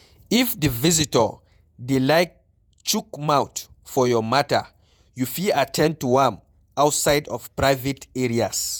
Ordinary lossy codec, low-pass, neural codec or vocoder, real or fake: none; none; vocoder, 48 kHz, 128 mel bands, Vocos; fake